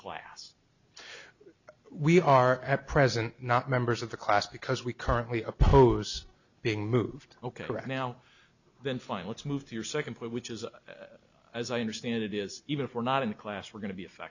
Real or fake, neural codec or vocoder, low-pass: real; none; 7.2 kHz